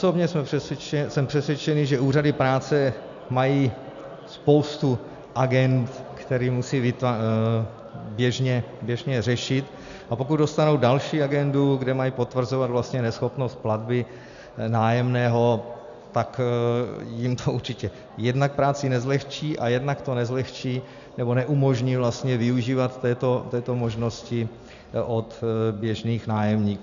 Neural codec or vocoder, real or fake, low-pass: none; real; 7.2 kHz